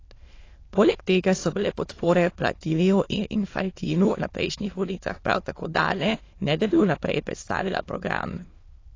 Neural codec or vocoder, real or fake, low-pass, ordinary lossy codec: autoencoder, 22.05 kHz, a latent of 192 numbers a frame, VITS, trained on many speakers; fake; 7.2 kHz; AAC, 32 kbps